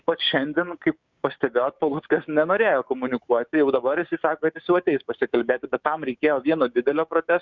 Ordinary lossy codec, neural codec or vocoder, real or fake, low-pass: Opus, 64 kbps; codec, 16 kHz, 6 kbps, DAC; fake; 7.2 kHz